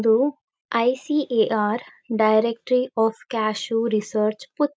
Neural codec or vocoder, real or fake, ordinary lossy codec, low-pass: none; real; none; none